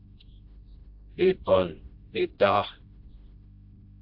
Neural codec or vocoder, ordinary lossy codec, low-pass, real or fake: codec, 16 kHz, 1 kbps, FreqCodec, smaller model; AAC, 48 kbps; 5.4 kHz; fake